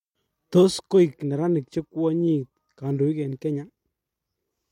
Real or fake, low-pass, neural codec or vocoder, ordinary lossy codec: real; 19.8 kHz; none; MP3, 64 kbps